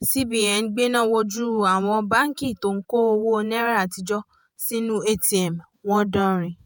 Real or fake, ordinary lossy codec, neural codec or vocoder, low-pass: fake; none; vocoder, 48 kHz, 128 mel bands, Vocos; none